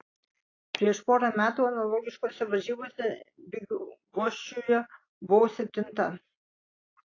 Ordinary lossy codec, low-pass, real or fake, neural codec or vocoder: AAC, 32 kbps; 7.2 kHz; real; none